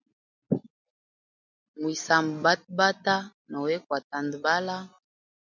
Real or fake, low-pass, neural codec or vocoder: real; 7.2 kHz; none